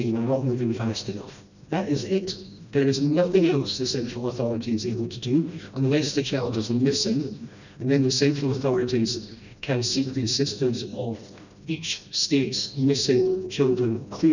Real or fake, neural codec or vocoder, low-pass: fake; codec, 16 kHz, 1 kbps, FreqCodec, smaller model; 7.2 kHz